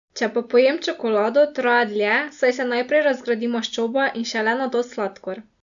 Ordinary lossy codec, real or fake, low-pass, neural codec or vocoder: none; real; 7.2 kHz; none